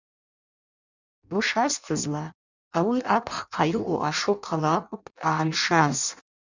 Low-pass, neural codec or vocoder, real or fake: 7.2 kHz; codec, 16 kHz in and 24 kHz out, 0.6 kbps, FireRedTTS-2 codec; fake